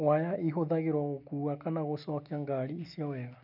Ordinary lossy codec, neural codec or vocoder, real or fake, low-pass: none; none; real; 5.4 kHz